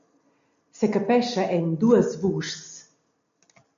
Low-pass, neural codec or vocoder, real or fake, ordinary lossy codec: 7.2 kHz; none; real; AAC, 64 kbps